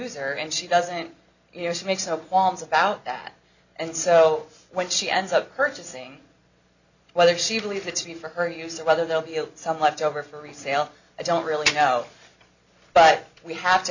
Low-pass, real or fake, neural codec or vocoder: 7.2 kHz; real; none